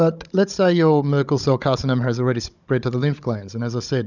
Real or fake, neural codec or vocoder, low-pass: fake; codec, 16 kHz, 16 kbps, FunCodec, trained on Chinese and English, 50 frames a second; 7.2 kHz